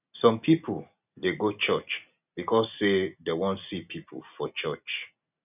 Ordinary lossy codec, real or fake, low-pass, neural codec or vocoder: none; real; 3.6 kHz; none